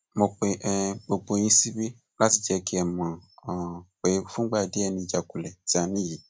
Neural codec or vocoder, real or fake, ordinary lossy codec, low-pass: none; real; none; none